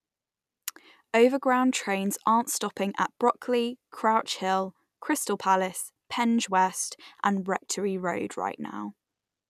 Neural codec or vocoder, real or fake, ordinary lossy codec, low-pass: none; real; none; 14.4 kHz